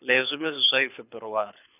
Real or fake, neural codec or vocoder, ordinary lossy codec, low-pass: fake; codec, 24 kHz, 6 kbps, HILCodec; none; 3.6 kHz